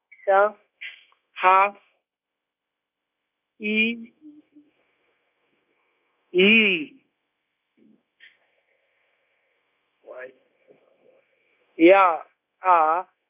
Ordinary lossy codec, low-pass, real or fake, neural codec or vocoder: none; 3.6 kHz; fake; codec, 16 kHz, 0.9 kbps, LongCat-Audio-Codec